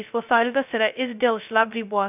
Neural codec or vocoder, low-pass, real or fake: codec, 16 kHz, 0.2 kbps, FocalCodec; 3.6 kHz; fake